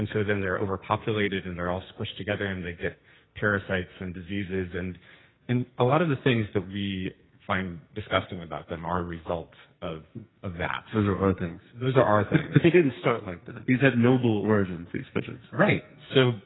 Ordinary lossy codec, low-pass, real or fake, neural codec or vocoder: AAC, 16 kbps; 7.2 kHz; fake; codec, 44.1 kHz, 2.6 kbps, SNAC